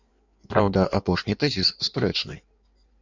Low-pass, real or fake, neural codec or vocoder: 7.2 kHz; fake; codec, 16 kHz in and 24 kHz out, 1.1 kbps, FireRedTTS-2 codec